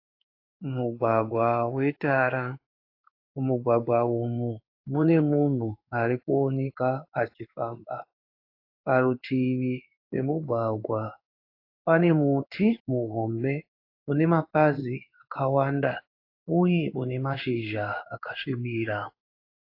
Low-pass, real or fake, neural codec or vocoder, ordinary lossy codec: 5.4 kHz; fake; codec, 16 kHz in and 24 kHz out, 1 kbps, XY-Tokenizer; AAC, 32 kbps